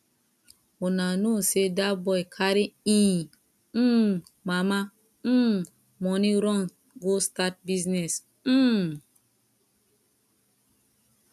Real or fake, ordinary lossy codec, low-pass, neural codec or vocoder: real; none; 14.4 kHz; none